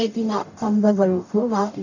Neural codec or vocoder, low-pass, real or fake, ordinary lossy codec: codec, 44.1 kHz, 0.9 kbps, DAC; 7.2 kHz; fake; none